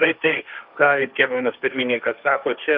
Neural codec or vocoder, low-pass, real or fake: codec, 16 kHz, 1.1 kbps, Voila-Tokenizer; 5.4 kHz; fake